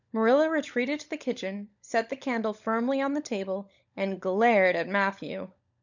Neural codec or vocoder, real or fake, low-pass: codec, 16 kHz, 16 kbps, FunCodec, trained on LibriTTS, 50 frames a second; fake; 7.2 kHz